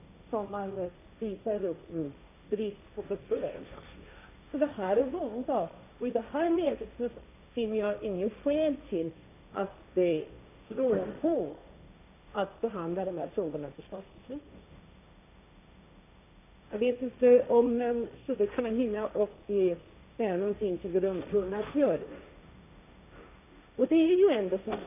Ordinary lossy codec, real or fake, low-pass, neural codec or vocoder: none; fake; 3.6 kHz; codec, 16 kHz, 1.1 kbps, Voila-Tokenizer